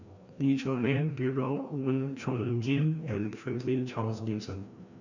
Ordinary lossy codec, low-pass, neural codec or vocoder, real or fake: none; 7.2 kHz; codec, 16 kHz, 1 kbps, FreqCodec, larger model; fake